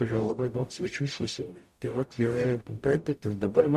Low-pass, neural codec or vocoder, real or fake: 14.4 kHz; codec, 44.1 kHz, 0.9 kbps, DAC; fake